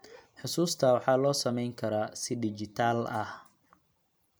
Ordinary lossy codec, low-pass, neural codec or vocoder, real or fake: none; none; none; real